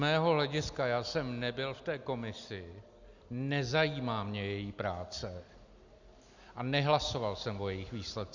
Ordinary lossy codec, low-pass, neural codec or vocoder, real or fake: Opus, 64 kbps; 7.2 kHz; none; real